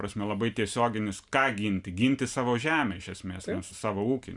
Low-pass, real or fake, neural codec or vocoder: 10.8 kHz; real; none